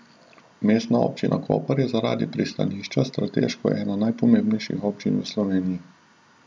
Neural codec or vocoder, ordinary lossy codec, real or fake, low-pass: none; none; real; none